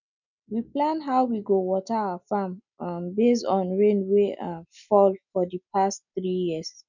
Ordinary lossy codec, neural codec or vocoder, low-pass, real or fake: none; none; 7.2 kHz; real